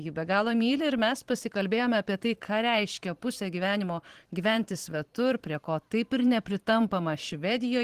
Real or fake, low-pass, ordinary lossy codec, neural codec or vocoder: fake; 14.4 kHz; Opus, 16 kbps; vocoder, 44.1 kHz, 128 mel bands every 512 samples, BigVGAN v2